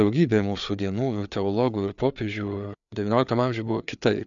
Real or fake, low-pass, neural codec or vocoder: fake; 7.2 kHz; codec, 16 kHz, 4 kbps, FreqCodec, larger model